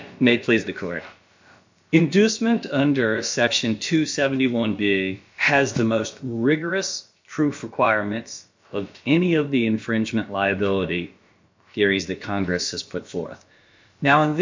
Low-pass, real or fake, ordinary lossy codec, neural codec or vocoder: 7.2 kHz; fake; MP3, 48 kbps; codec, 16 kHz, about 1 kbps, DyCAST, with the encoder's durations